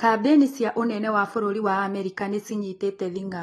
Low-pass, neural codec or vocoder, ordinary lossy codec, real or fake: 19.8 kHz; none; AAC, 32 kbps; real